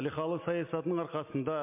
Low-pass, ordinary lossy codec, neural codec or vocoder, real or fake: 3.6 kHz; MP3, 32 kbps; none; real